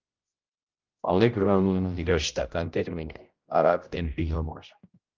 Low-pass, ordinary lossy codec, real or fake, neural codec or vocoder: 7.2 kHz; Opus, 32 kbps; fake; codec, 16 kHz, 0.5 kbps, X-Codec, HuBERT features, trained on general audio